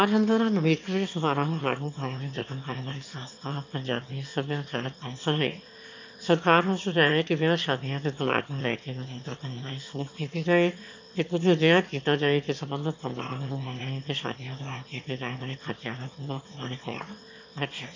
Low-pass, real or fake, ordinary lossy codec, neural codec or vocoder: 7.2 kHz; fake; MP3, 48 kbps; autoencoder, 22.05 kHz, a latent of 192 numbers a frame, VITS, trained on one speaker